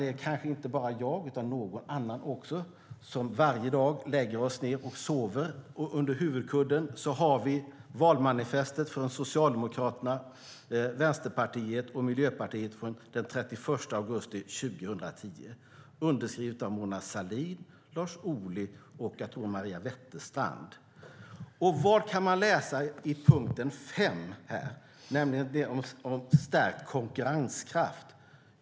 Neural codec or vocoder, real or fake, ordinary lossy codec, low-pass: none; real; none; none